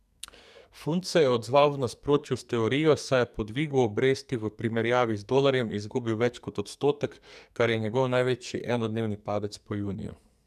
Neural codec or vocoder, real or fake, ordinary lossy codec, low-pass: codec, 44.1 kHz, 2.6 kbps, SNAC; fake; none; 14.4 kHz